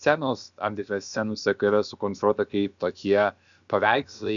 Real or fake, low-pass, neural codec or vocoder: fake; 7.2 kHz; codec, 16 kHz, about 1 kbps, DyCAST, with the encoder's durations